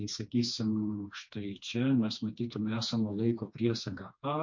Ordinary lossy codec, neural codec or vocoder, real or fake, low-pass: MP3, 48 kbps; codec, 16 kHz, 2 kbps, FreqCodec, smaller model; fake; 7.2 kHz